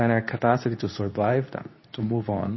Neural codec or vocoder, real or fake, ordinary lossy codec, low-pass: codec, 24 kHz, 0.9 kbps, WavTokenizer, medium speech release version 2; fake; MP3, 24 kbps; 7.2 kHz